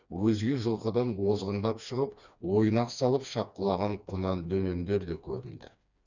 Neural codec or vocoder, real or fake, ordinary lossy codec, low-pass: codec, 16 kHz, 2 kbps, FreqCodec, smaller model; fake; none; 7.2 kHz